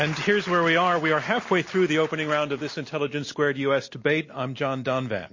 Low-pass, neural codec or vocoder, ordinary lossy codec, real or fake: 7.2 kHz; none; MP3, 32 kbps; real